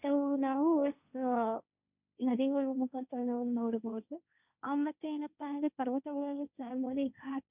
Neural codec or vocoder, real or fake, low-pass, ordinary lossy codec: codec, 16 kHz, 1.1 kbps, Voila-Tokenizer; fake; 3.6 kHz; none